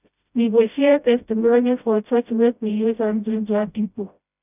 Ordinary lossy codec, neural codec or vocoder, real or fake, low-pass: none; codec, 16 kHz, 0.5 kbps, FreqCodec, smaller model; fake; 3.6 kHz